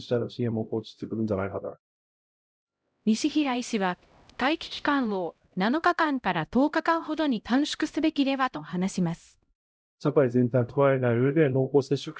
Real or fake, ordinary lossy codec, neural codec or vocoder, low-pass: fake; none; codec, 16 kHz, 0.5 kbps, X-Codec, HuBERT features, trained on LibriSpeech; none